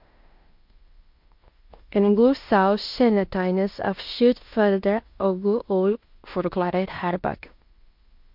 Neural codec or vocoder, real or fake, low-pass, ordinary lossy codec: codec, 16 kHz in and 24 kHz out, 0.9 kbps, LongCat-Audio-Codec, fine tuned four codebook decoder; fake; 5.4 kHz; MP3, 48 kbps